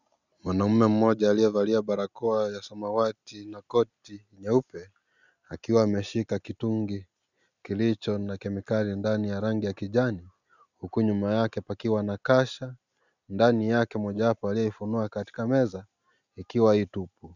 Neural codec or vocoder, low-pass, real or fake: none; 7.2 kHz; real